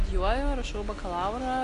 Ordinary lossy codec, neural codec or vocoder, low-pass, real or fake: AAC, 48 kbps; none; 10.8 kHz; real